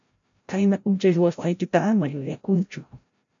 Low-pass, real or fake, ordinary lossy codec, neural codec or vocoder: 7.2 kHz; fake; MP3, 64 kbps; codec, 16 kHz, 0.5 kbps, FreqCodec, larger model